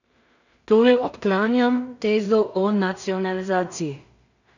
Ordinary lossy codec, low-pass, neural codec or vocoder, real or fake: none; 7.2 kHz; codec, 16 kHz in and 24 kHz out, 0.4 kbps, LongCat-Audio-Codec, two codebook decoder; fake